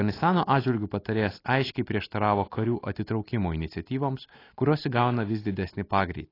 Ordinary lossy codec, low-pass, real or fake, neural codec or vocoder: AAC, 24 kbps; 5.4 kHz; real; none